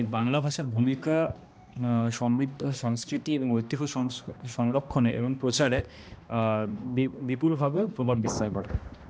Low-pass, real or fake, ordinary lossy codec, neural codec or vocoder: none; fake; none; codec, 16 kHz, 1 kbps, X-Codec, HuBERT features, trained on balanced general audio